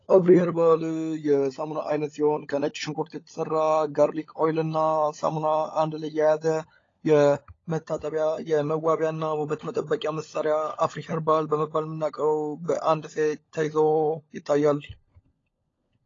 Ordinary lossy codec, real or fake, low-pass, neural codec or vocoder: AAC, 32 kbps; fake; 7.2 kHz; codec, 16 kHz, 16 kbps, FunCodec, trained on LibriTTS, 50 frames a second